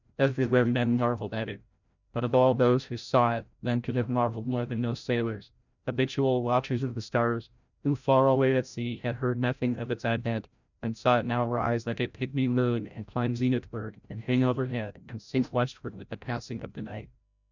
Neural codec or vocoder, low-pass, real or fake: codec, 16 kHz, 0.5 kbps, FreqCodec, larger model; 7.2 kHz; fake